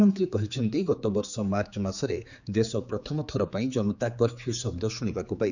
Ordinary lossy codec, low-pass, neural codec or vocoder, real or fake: none; 7.2 kHz; codec, 16 kHz, 4 kbps, X-Codec, HuBERT features, trained on general audio; fake